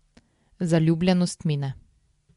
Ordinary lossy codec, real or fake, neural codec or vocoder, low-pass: MP3, 64 kbps; real; none; 10.8 kHz